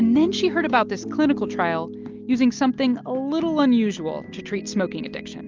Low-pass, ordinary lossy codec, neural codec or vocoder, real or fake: 7.2 kHz; Opus, 32 kbps; none; real